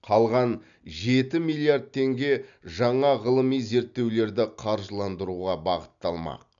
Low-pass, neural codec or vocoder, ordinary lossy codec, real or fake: 7.2 kHz; none; none; real